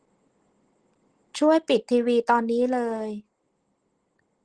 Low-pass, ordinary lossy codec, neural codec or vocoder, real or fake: 9.9 kHz; Opus, 16 kbps; none; real